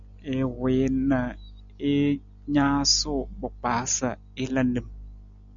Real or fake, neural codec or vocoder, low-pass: real; none; 7.2 kHz